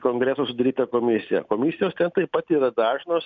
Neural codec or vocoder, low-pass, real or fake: none; 7.2 kHz; real